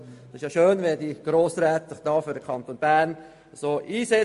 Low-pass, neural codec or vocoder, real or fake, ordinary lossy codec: 14.4 kHz; none; real; MP3, 48 kbps